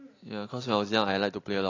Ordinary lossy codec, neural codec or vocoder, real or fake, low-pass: AAC, 32 kbps; none; real; 7.2 kHz